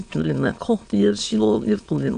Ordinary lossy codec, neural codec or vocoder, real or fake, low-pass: AAC, 64 kbps; autoencoder, 22.05 kHz, a latent of 192 numbers a frame, VITS, trained on many speakers; fake; 9.9 kHz